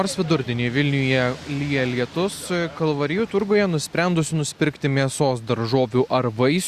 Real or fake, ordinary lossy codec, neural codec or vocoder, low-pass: real; Opus, 64 kbps; none; 14.4 kHz